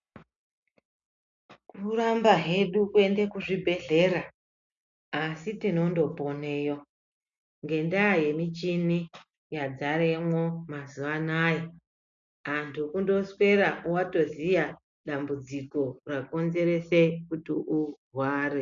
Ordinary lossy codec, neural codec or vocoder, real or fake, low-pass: MP3, 64 kbps; none; real; 7.2 kHz